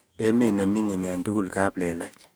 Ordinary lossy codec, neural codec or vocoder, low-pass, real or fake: none; codec, 44.1 kHz, 2.6 kbps, DAC; none; fake